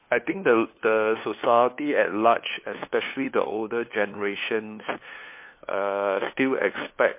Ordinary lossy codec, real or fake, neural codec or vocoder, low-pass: MP3, 24 kbps; fake; codec, 16 kHz, 2 kbps, FunCodec, trained on LibriTTS, 25 frames a second; 3.6 kHz